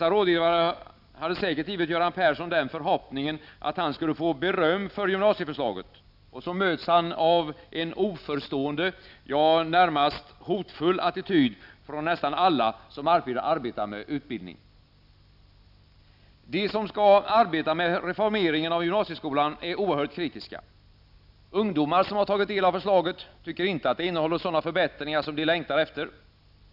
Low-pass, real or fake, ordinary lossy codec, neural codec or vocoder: 5.4 kHz; real; none; none